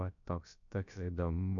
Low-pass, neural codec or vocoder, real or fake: 7.2 kHz; codec, 16 kHz, about 1 kbps, DyCAST, with the encoder's durations; fake